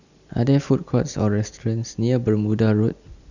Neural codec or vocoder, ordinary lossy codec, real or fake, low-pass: none; none; real; 7.2 kHz